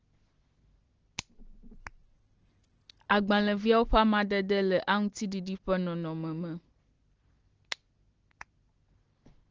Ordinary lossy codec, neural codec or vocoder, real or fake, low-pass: Opus, 16 kbps; none; real; 7.2 kHz